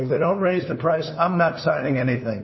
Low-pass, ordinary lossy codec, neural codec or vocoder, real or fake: 7.2 kHz; MP3, 24 kbps; codec, 16 kHz, 2 kbps, FunCodec, trained on LibriTTS, 25 frames a second; fake